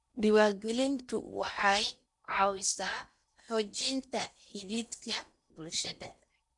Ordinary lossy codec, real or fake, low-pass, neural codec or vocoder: none; fake; 10.8 kHz; codec, 16 kHz in and 24 kHz out, 0.8 kbps, FocalCodec, streaming, 65536 codes